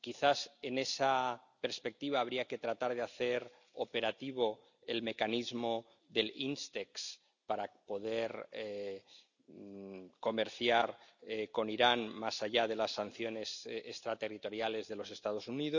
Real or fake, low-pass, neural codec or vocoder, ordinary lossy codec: real; 7.2 kHz; none; none